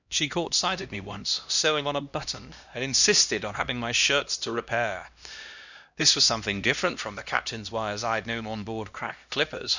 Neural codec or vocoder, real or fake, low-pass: codec, 16 kHz, 1 kbps, X-Codec, HuBERT features, trained on LibriSpeech; fake; 7.2 kHz